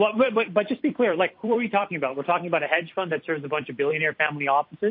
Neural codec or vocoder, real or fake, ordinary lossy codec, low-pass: vocoder, 44.1 kHz, 128 mel bands every 256 samples, BigVGAN v2; fake; MP3, 32 kbps; 5.4 kHz